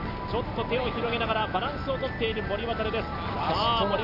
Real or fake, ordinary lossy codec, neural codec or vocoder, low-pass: real; none; none; 5.4 kHz